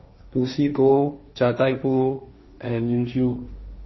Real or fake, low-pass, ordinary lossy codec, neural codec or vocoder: fake; 7.2 kHz; MP3, 24 kbps; codec, 16 kHz, 1.1 kbps, Voila-Tokenizer